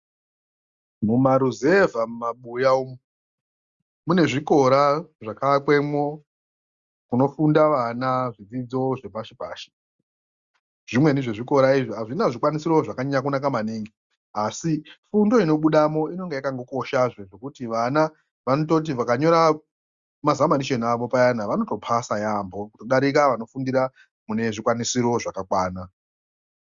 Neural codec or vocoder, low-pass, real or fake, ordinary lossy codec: none; 7.2 kHz; real; Opus, 64 kbps